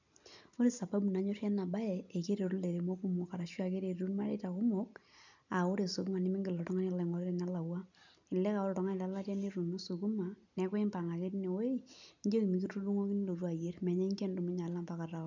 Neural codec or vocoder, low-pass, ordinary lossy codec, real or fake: none; 7.2 kHz; none; real